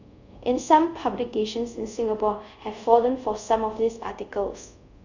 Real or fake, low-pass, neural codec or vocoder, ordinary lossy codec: fake; 7.2 kHz; codec, 24 kHz, 0.5 kbps, DualCodec; none